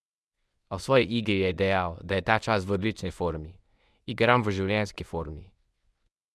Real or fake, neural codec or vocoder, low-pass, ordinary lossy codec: fake; codec, 24 kHz, 0.9 kbps, WavTokenizer, medium speech release version 1; none; none